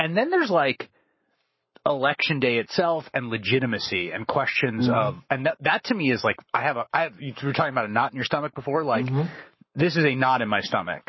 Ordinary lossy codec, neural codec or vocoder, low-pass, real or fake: MP3, 24 kbps; codec, 44.1 kHz, 7.8 kbps, Pupu-Codec; 7.2 kHz; fake